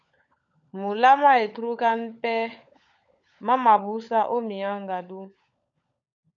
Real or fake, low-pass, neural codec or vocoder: fake; 7.2 kHz; codec, 16 kHz, 16 kbps, FunCodec, trained on LibriTTS, 50 frames a second